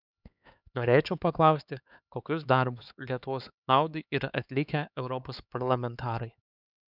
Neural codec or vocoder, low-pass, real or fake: codec, 16 kHz, 4 kbps, X-Codec, HuBERT features, trained on LibriSpeech; 5.4 kHz; fake